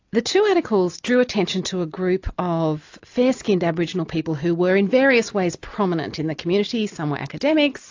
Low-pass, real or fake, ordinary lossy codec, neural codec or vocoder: 7.2 kHz; real; AAC, 48 kbps; none